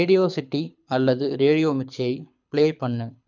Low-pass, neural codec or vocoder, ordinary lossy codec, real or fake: 7.2 kHz; codec, 24 kHz, 6 kbps, HILCodec; none; fake